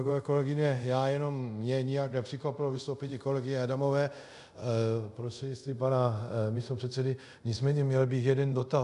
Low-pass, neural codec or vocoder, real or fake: 10.8 kHz; codec, 24 kHz, 0.5 kbps, DualCodec; fake